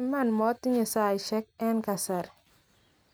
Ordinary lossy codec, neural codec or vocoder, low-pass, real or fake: none; none; none; real